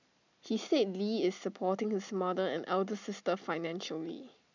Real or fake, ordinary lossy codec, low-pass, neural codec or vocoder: real; none; 7.2 kHz; none